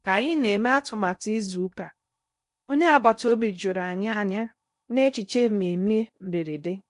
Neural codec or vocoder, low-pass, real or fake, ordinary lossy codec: codec, 16 kHz in and 24 kHz out, 0.6 kbps, FocalCodec, streaming, 4096 codes; 10.8 kHz; fake; MP3, 64 kbps